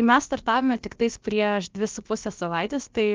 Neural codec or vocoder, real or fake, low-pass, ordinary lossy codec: codec, 16 kHz, about 1 kbps, DyCAST, with the encoder's durations; fake; 7.2 kHz; Opus, 32 kbps